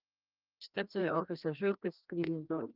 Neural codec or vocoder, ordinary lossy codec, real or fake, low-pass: codec, 16 kHz, 1 kbps, FreqCodec, larger model; Opus, 32 kbps; fake; 5.4 kHz